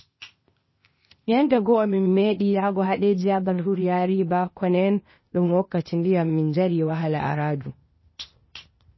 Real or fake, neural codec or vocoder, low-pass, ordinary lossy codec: fake; codec, 16 kHz, 0.8 kbps, ZipCodec; 7.2 kHz; MP3, 24 kbps